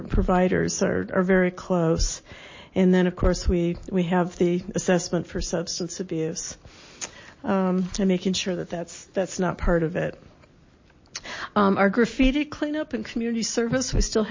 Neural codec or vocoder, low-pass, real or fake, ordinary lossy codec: none; 7.2 kHz; real; MP3, 32 kbps